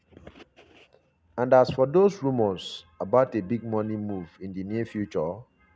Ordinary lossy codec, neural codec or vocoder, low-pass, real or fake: none; none; none; real